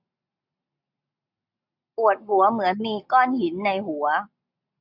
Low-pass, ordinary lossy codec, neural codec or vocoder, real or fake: 5.4 kHz; none; none; real